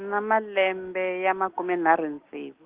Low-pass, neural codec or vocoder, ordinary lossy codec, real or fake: 3.6 kHz; none; Opus, 16 kbps; real